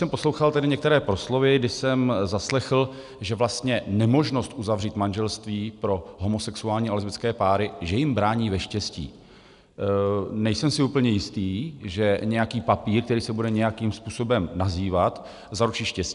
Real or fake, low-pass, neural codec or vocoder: real; 10.8 kHz; none